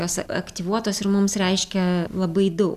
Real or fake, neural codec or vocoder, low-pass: real; none; 14.4 kHz